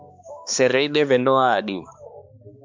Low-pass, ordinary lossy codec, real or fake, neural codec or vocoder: 7.2 kHz; MP3, 64 kbps; fake; codec, 16 kHz, 2 kbps, X-Codec, HuBERT features, trained on balanced general audio